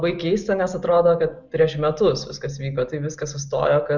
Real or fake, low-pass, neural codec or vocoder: real; 7.2 kHz; none